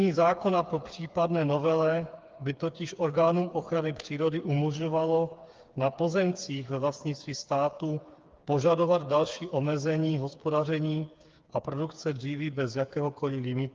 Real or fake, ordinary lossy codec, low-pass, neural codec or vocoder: fake; Opus, 24 kbps; 7.2 kHz; codec, 16 kHz, 4 kbps, FreqCodec, smaller model